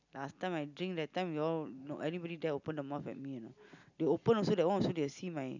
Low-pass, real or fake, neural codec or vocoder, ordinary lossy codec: 7.2 kHz; real; none; none